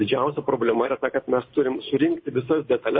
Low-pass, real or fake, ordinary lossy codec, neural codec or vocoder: 7.2 kHz; real; MP3, 24 kbps; none